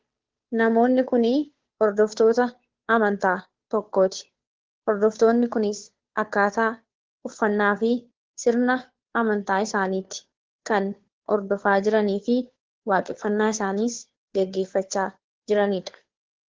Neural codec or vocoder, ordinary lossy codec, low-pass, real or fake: codec, 16 kHz, 2 kbps, FunCodec, trained on Chinese and English, 25 frames a second; Opus, 16 kbps; 7.2 kHz; fake